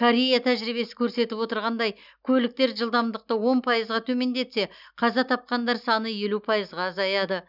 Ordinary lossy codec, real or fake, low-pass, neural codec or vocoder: none; real; 5.4 kHz; none